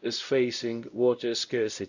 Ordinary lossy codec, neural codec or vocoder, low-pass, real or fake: none; codec, 16 kHz, 0.5 kbps, X-Codec, WavLM features, trained on Multilingual LibriSpeech; 7.2 kHz; fake